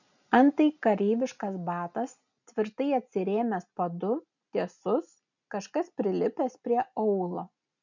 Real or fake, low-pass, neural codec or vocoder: real; 7.2 kHz; none